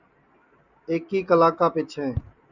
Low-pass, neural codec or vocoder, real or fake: 7.2 kHz; none; real